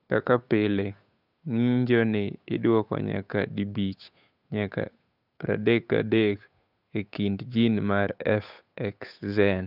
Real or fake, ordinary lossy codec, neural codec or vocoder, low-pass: fake; none; codec, 16 kHz, 8 kbps, FunCodec, trained on Chinese and English, 25 frames a second; 5.4 kHz